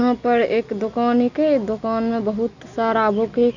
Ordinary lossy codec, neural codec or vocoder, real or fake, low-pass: none; none; real; 7.2 kHz